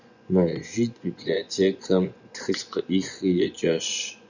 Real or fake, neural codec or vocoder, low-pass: fake; vocoder, 24 kHz, 100 mel bands, Vocos; 7.2 kHz